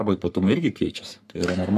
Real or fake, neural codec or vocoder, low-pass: fake; codec, 44.1 kHz, 3.4 kbps, Pupu-Codec; 14.4 kHz